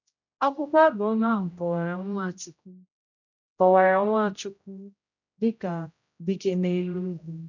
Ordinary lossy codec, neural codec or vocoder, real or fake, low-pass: none; codec, 16 kHz, 0.5 kbps, X-Codec, HuBERT features, trained on general audio; fake; 7.2 kHz